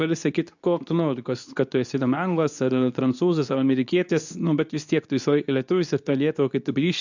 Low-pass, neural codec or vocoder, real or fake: 7.2 kHz; codec, 24 kHz, 0.9 kbps, WavTokenizer, medium speech release version 2; fake